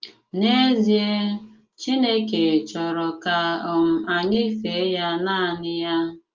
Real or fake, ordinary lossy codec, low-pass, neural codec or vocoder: real; Opus, 24 kbps; 7.2 kHz; none